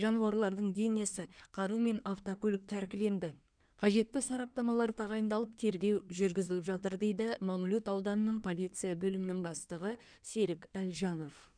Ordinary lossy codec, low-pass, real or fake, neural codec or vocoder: none; 9.9 kHz; fake; codec, 24 kHz, 1 kbps, SNAC